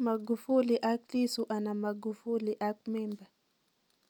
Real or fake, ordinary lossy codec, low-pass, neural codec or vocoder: real; none; 19.8 kHz; none